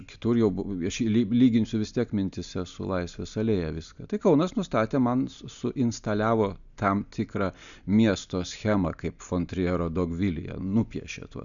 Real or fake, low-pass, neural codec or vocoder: real; 7.2 kHz; none